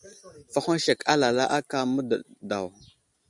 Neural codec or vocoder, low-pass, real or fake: none; 10.8 kHz; real